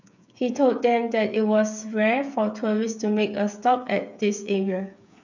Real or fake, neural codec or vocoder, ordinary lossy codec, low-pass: fake; codec, 16 kHz, 8 kbps, FreqCodec, smaller model; none; 7.2 kHz